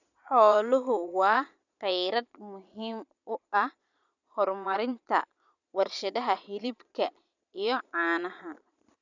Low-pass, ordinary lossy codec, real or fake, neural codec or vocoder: 7.2 kHz; none; fake; vocoder, 22.05 kHz, 80 mel bands, Vocos